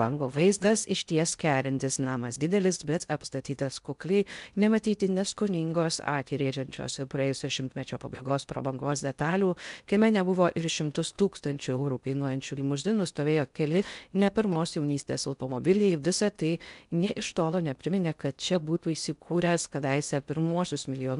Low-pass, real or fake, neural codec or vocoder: 10.8 kHz; fake; codec, 16 kHz in and 24 kHz out, 0.6 kbps, FocalCodec, streaming, 4096 codes